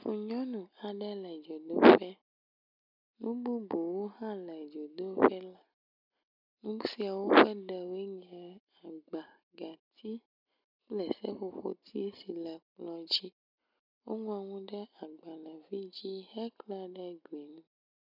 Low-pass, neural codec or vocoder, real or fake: 5.4 kHz; none; real